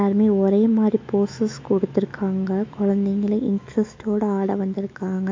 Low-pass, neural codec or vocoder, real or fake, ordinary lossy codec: 7.2 kHz; autoencoder, 48 kHz, 128 numbers a frame, DAC-VAE, trained on Japanese speech; fake; MP3, 48 kbps